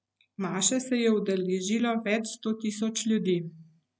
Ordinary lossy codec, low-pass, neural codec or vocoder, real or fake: none; none; none; real